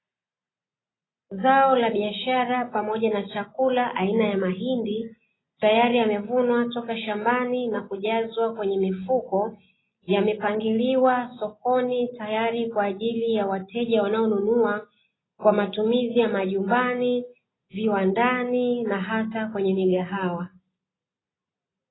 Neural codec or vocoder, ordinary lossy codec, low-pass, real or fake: none; AAC, 16 kbps; 7.2 kHz; real